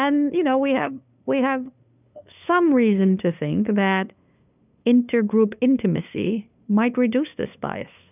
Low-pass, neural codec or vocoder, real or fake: 3.6 kHz; codec, 16 kHz, 2 kbps, FunCodec, trained on LibriTTS, 25 frames a second; fake